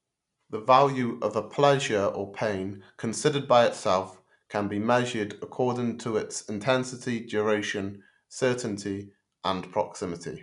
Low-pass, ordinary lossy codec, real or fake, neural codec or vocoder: 10.8 kHz; none; real; none